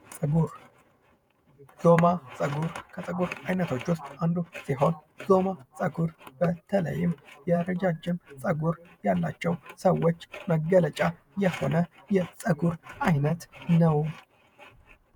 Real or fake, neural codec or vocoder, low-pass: fake; vocoder, 48 kHz, 128 mel bands, Vocos; 19.8 kHz